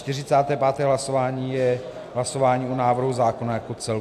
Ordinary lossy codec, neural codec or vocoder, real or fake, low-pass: AAC, 96 kbps; none; real; 14.4 kHz